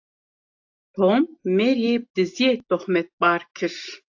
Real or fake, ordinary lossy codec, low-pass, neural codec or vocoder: real; AAC, 48 kbps; 7.2 kHz; none